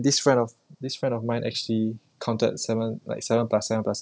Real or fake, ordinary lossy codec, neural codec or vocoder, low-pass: real; none; none; none